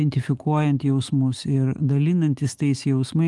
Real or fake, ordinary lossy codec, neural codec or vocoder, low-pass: fake; Opus, 24 kbps; autoencoder, 48 kHz, 128 numbers a frame, DAC-VAE, trained on Japanese speech; 10.8 kHz